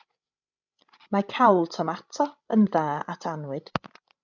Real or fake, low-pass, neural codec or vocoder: fake; 7.2 kHz; codec, 16 kHz, 16 kbps, FreqCodec, larger model